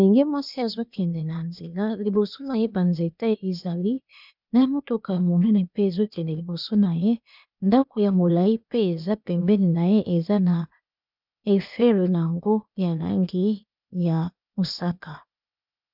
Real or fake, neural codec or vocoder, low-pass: fake; codec, 16 kHz, 0.8 kbps, ZipCodec; 5.4 kHz